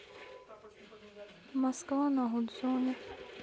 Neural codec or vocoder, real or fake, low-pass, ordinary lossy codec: none; real; none; none